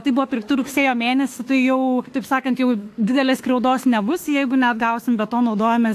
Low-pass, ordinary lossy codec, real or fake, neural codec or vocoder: 14.4 kHz; AAC, 64 kbps; fake; autoencoder, 48 kHz, 32 numbers a frame, DAC-VAE, trained on Japanese speech